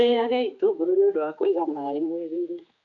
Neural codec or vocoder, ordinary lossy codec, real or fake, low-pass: codec, 16 kHz, 2 kbps, X-Codec, HuBERT features, trained on general audio; none; fake; 7.2 kHz